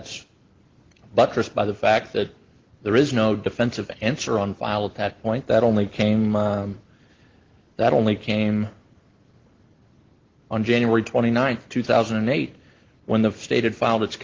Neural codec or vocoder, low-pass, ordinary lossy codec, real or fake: none; 7.2 kHz; Opus, 16 kbps; real